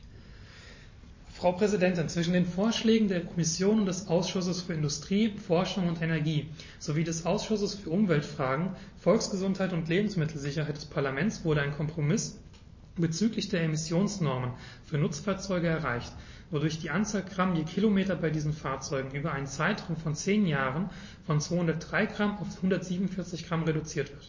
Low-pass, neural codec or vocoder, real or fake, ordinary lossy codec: 7.2 kHz; none; real; MP3, 32 kbps